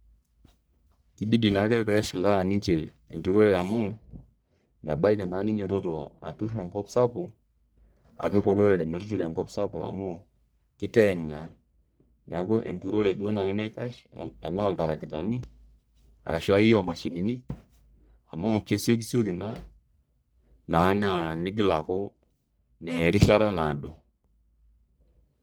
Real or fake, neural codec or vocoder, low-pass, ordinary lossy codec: fake; codec, 44.1 kHz, 1.7 kbps, Pupu-Codec; none; none